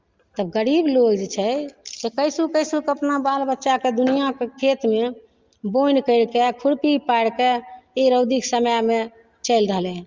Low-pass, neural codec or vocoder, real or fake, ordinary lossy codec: 7.2 kHz; none; real; Opus, 32 kbps